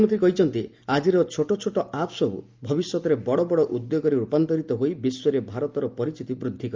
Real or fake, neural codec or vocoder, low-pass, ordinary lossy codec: real; none; 7.2 kHz; Opus, 32 kbps